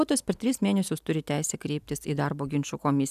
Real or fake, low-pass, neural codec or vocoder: real; 14.4 kHz; none